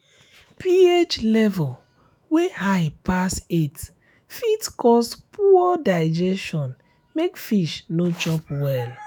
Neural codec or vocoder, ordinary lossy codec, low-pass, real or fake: autoencoder, 48 kHz, 128 numbers a frame, DAC-VAE, trained on Japanese speech; none; none; fake